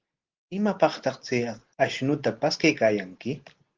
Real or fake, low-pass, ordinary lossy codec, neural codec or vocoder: real; 7.2 kHz; Opus, 16 kbps; none